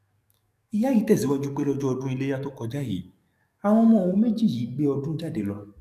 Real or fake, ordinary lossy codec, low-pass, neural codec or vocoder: fake; none; 14.4 kHz; codec, 44.1 kHz, 7.8 kbps, DAC